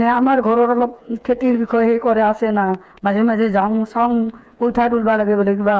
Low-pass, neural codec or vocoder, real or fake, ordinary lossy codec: none; codec, 16 kHz, 4 kbps, FreqCodec, smaller model; fake; none